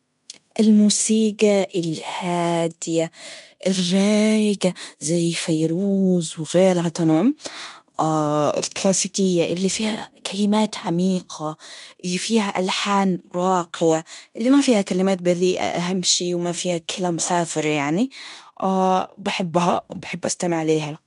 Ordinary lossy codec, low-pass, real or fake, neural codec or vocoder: none; 10.8 kHz; fake; codec, 16 kHz in and 24 kHz out, 0.9 kbps, LongCat-Audio-Codec, fine tuned four codebook decoder